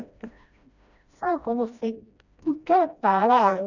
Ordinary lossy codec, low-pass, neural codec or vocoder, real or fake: none; 7.2 kHz; codec, 16 kHz, 1 kbps, FreqCodec, smaller model; fake